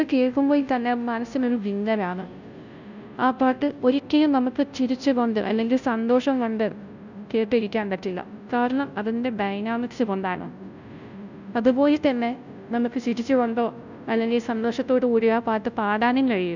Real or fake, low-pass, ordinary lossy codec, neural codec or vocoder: fake; 7.2 kHz; none; codec, 16 kHz, 0.5 kbps, FunCodec, trained on Chinese and English, 25 frames a second